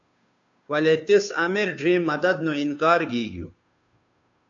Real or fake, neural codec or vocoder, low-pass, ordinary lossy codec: fake; codec, 16 kHz, 2 kbps, FunCodec, trained on Chinese and English, 25 frames a second; 7.2 kHz; AAC, 64 kbps